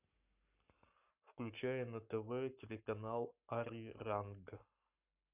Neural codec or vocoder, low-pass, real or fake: codec, 44.1 kHz, 7.8 kbps, Pupu-Codec; 3.6 kHz; fake